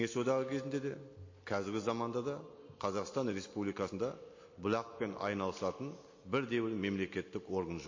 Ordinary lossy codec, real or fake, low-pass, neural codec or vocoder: MP3, 32 kbps; real; 7.2 kHz; none